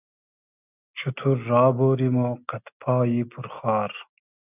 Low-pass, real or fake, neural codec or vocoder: 3.6 kHz; real; none